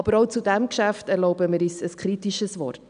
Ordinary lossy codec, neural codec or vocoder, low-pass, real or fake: none; none; 9.9 kHz; real